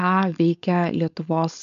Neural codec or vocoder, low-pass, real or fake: codec, 16 kHz, 4.8 kbps, FACodec; 7.2 kHz; fake